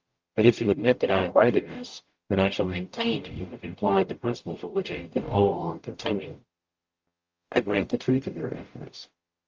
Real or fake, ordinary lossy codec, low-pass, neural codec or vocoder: fake; Opus, 24 kbps; 7.2 kHz; codec, 44.1 kHz, 0.9 kbps, DAC